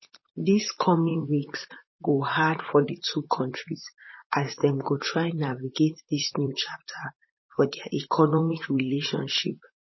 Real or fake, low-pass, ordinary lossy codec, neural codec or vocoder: fake; 7.2 kHz; MP3, 24 kbps; vocoder, 44.1 kHz, 128 mel bands, Pupu-Vocoder